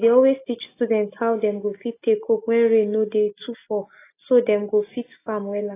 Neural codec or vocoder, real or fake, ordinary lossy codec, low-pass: none; real; AAC, 24 kbps; 3.6 kHz